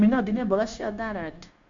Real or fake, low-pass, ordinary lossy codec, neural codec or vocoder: fake; 7.2 kHz; MP3, 64 kbps; codec, 16 kHz, 0.9 kbps, LongCat-Audio-Codec